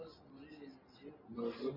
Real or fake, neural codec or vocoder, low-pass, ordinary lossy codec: real; none; 5.4 kHz; AAC, 48 kbps